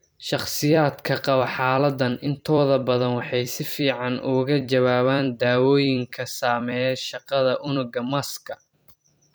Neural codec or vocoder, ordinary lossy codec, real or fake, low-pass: vocoder, 44.1 kHz, 128 mel bands every 256 samples, BigVGAN v2; none; fake; none